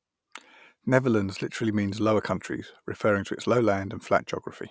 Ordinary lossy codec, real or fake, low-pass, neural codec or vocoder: none; real; none; none